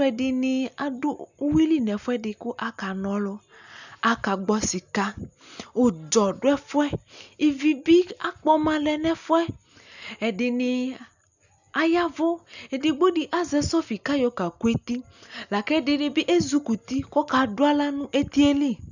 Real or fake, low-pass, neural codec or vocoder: real; 7.2 kHz; none